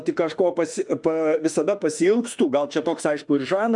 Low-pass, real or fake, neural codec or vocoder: 10.8 kHz; fake; autoencoder, 48 kHz, 32 numbers a frame, DAC-VAE, trained on Japanese speech